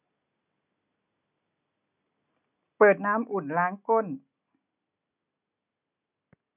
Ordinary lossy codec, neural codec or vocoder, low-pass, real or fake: none; none; 3.6 kHz; real